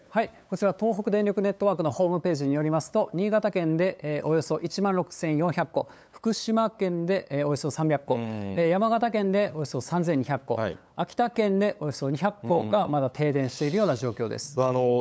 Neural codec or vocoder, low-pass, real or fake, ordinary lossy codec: codec, 16 kHz, 8 kbps, FunCodec, trained on LibriTTS, 25 frames a second; none; fake; none